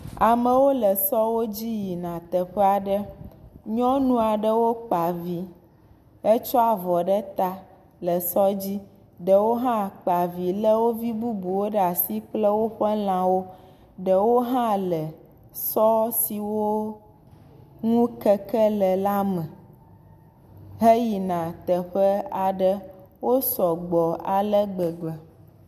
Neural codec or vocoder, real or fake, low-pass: none; real; 14.4 kHz